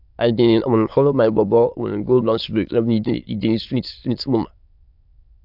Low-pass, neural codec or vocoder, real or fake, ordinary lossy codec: 5.4 kHz; autoencoder, 22.05 kHz, a latent of 192 numbers a frame, VITS, trained on many speakers; fake; AAC, 48 kbps